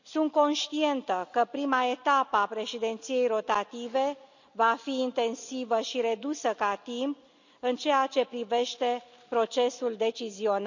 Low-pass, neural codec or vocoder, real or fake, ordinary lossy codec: 7.2 kHz; none; real; none